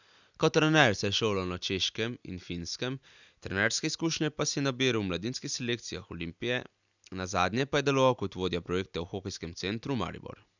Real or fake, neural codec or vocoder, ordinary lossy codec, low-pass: real; none; none; 7.2 kHz